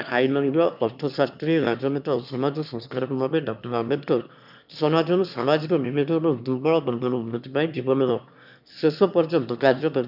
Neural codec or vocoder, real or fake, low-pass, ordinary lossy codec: autoencoder, 22.05 kHz, a latent of 192 numbers a frame, VITS, trained on one speaker; fake; 5.4 kHz; none